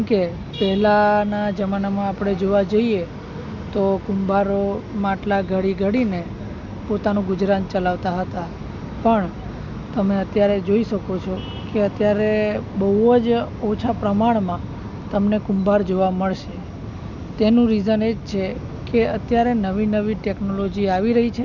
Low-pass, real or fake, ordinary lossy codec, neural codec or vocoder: 7.2 kHz; real; none; none